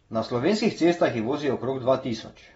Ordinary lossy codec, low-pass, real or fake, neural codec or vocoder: AAC, 24 kbps; 19.8 kHz; real; none